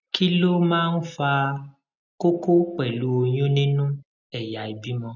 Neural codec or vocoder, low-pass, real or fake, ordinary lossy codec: none; 7.2 kHz; real; none